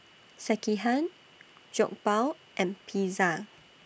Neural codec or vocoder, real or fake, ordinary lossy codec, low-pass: none; real; none; none